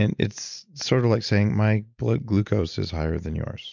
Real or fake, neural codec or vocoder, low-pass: real; none; 7.2 kHz